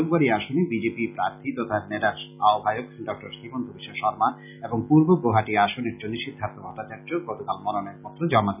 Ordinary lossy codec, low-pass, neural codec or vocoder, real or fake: none; 3.6 kHz; none; real